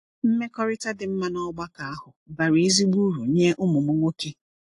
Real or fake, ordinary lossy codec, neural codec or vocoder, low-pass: real; AAC, 48 kbps; none; 10.8 kHz